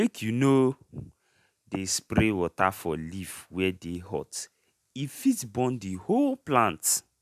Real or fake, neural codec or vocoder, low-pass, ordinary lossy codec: real; none; 14.4 kHz; none